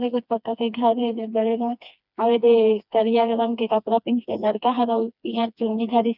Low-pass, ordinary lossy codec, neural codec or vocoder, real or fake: 5.4 kHz; none; codec, 16 kHz, 2 kbps, FreqCodec, smaller model; fake